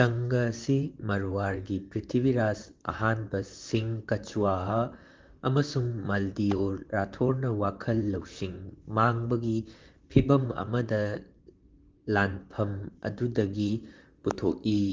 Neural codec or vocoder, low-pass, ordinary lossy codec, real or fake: vocoder, 44.1 kHz, 128 mel bands, Pupu-Vocoder; 7.2 kHz; Opus, 32 kbps; fake